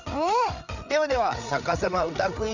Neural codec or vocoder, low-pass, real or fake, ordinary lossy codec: codec, 16 kHz, 8 kbps, FreqCodec, larger model; 7.2 kHz; fake; none